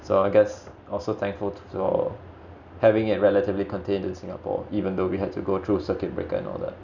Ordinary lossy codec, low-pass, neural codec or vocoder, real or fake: none; 7.2 kHz; none; real